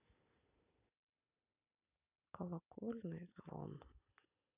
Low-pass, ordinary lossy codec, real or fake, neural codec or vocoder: 3.6 kHz; none; real; none